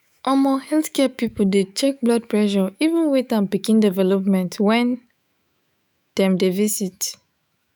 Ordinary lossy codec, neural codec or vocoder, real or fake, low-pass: none; autoencoder, 48 kHz, 128 numbers a frame, DAC-VAE, trained on Japanese speech; fake; none